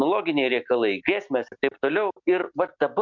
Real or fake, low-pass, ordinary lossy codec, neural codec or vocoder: real; 7.2 kHz; MP3, 64 kbps; none